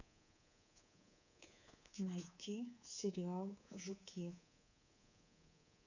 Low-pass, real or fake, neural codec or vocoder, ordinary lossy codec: 7.2 kHz; fake; codec, 24 kHz, 3.1 kbps, DualCodec; none